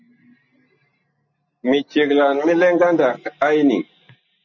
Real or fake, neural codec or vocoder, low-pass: real; none; 7.2 kHz